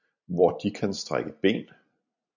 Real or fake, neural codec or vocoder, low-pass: real; none; 7.2 kHz